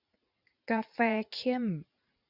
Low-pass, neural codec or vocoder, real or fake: 5.4 kHz; vocoder, 44.1 kHz, 80 mel bands, Vocos; fake